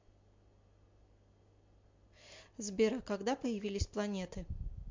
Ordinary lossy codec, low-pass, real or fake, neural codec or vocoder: MP3, 48 kbps; 7.2 kHz; real; none